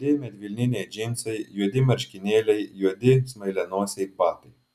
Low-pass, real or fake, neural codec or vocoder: 14.4 kHz; real; none